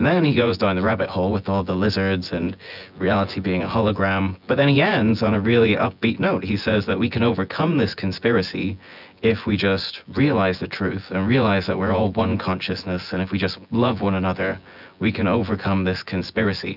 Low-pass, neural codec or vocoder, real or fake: 5.4 kHz; vocoder, 24 kHz, 100 mel bands, Vocos; fake